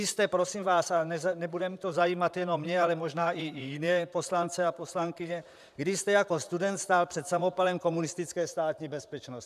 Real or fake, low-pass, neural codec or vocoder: fake; 14.4 kHz; vocoder, 44.1 kHz, 128 mel bands, Pupu-Vocoder